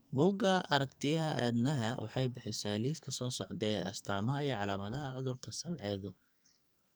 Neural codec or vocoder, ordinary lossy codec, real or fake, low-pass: codec, 44.1 kHz, 2.6 kbps, SNAC; none; fake; none